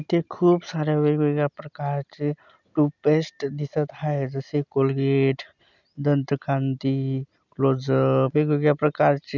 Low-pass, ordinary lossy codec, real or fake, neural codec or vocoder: 7.2 kHz; none; real; none